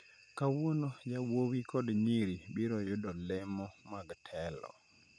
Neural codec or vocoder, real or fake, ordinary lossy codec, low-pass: none; real; none; 9.9 kHz